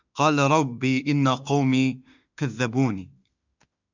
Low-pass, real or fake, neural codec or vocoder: 7.2 kHz; fake; autoencoder, 48 kHz, 32 numbers a frame, DAC-VAE, trained on Japanese speech